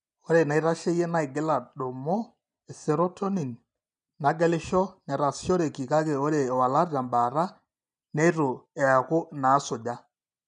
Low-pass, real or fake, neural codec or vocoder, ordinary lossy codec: 9.9 kHz; real; none; none